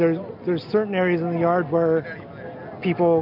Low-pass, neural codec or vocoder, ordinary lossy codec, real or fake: 5.4 kHz; none; AAC, 48 kbps; real